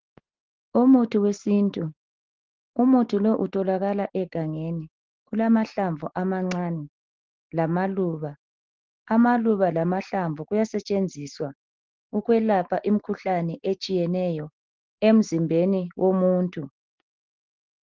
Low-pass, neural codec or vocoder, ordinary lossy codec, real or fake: 7.2 kHz; none; Opus, 16 kbps; real